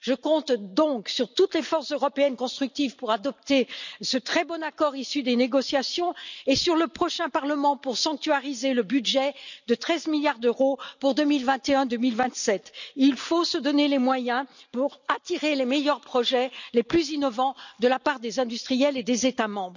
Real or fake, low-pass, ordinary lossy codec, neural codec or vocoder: real; 7.2 kHz; none; none